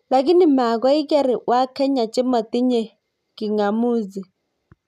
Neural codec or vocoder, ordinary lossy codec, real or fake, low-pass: none; none; real; 14.4 kHz